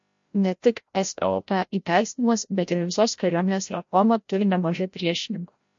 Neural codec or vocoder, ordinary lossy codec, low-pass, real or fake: codec, 16 kHz, 0.5 kbps, FreqCodec, larger model; MP3, 48 kbps; 7.2 kHz; fake